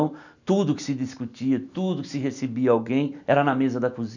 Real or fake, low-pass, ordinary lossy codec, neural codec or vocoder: real; 7.2 kHz; none; none